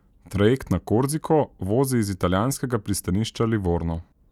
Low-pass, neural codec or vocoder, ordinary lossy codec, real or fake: 19.8 kHz; none; none; real